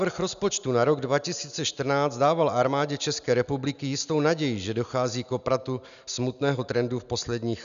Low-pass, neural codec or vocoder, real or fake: 7.2 kHz; none; real